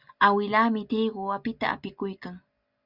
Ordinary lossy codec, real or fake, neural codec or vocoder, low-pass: Opus, 64 kbps; real; none; 5.4 kHz